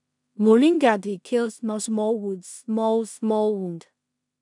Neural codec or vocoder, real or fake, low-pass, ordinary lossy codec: codec, 16 kHz in and 24 kHz out, 0.4 kbps, LongCat-Audio-Codec, two codebook decoder; fake; 10.8 kHz; none